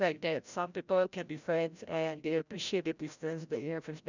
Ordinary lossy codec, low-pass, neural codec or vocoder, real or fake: none; 7.2 kHz; codec, 16 kHz, 0.5 kbps, FreqCodec, larger model; fake